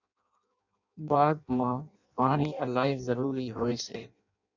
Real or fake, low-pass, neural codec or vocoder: fake; 7.2 kHz; codec, 16 kHz in and 24 kHz out, 0.6 kbps, FireRedTTS-2 codec